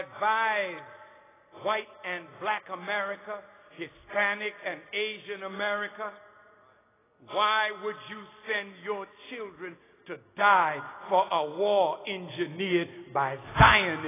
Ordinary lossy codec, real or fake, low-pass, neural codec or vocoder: AAC, 16 kbps; real; 3.6 kHz; none